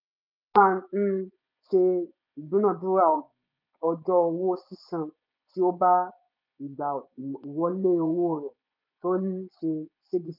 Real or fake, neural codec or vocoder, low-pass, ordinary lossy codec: fake; vocoder, 24 kHz, 100 mel bands, Vocos; 5.4 kHz; none